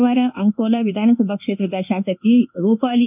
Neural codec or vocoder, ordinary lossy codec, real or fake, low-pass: codec, 24 kHz, 1.2 kbps, DualCodec; none; fake; 3.6 kHz